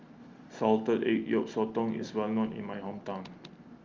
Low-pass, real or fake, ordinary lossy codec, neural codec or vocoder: 7.2 kHz; real; Opus, 32 kbps; none